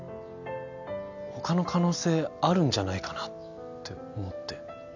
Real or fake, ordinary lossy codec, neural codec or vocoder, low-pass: real; none; none; 7.2 kHz